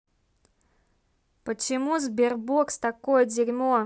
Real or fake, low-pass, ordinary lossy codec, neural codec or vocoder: real; none; none; none